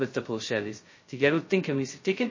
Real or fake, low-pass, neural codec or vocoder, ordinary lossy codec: fake; 7.2 kHz; codec, 16 kHz, 0.2 kbps, FocalCodec; MP3, 32 kbps